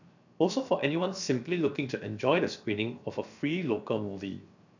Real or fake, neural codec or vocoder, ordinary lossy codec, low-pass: fake; codec, 16 kHz, 0.7 kbps, FocalCodec; none; 7.2 kHz